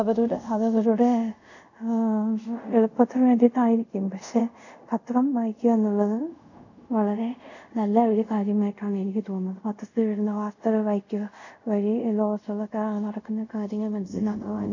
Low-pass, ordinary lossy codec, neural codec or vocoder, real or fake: 7.2 kHz; none; codec, 24 kHz, 0.5 kbps, DualCodec; fake